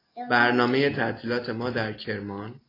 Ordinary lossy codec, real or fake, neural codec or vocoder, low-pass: AAC, 24 kbps; real; none; 5.4 kHz